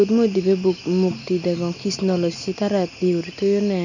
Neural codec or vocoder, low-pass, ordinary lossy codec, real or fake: none; 7.2 kHz; none; real